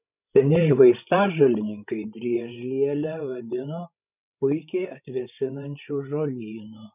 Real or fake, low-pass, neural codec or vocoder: fake; 3.6 kHz; codec, 16 kHz, 16 kbps, FreqCodec, larger model